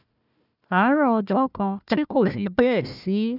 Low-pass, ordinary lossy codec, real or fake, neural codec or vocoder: 5.4 kHz; none; fake; codec, 16 kHz, 1 kbps, FunCodec, trained on Chinese and English, 50 frames a second